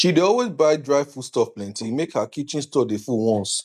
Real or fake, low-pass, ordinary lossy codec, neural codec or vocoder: real; 14.4 kHz; none; none